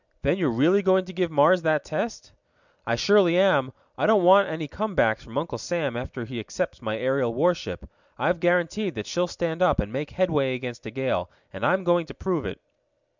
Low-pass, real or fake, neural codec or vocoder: 7.2 kHz; real; none